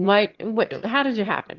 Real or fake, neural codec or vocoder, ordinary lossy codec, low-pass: fake; autoencoder, 22.05 kHz, a latent of 192 numbers a frame, VITS, trained on one speaker; Opus, 16 kbps; 7.2 kHz